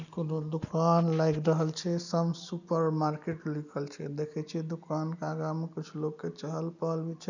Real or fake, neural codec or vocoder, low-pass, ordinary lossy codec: real; none; 7.2 kHz; none